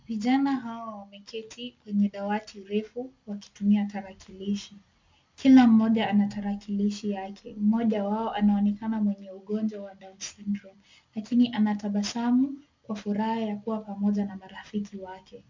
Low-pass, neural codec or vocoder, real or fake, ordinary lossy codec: 7.2 kHz; none; real; MP3, 64 kbps